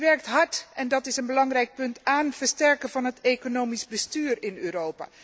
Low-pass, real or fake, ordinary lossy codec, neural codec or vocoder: none; real; none; none